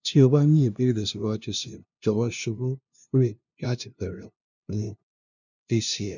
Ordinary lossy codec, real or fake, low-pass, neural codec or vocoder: none; fake; 7.2 kHz; codec, 16 kHz, 0.5 kbps, FunCodec, trained on LibriTTS, 25 frames a second